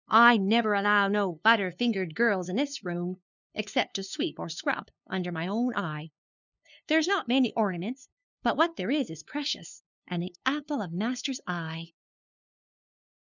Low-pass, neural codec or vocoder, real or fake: 7.2 kHz; codec, 16 kHz, 2 kbps, FunCodec, trained on LibriTTS, 25 frames a second; fake